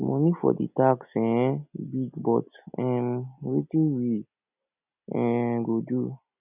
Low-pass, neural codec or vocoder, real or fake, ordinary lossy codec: 3.6 kHz; none; real; none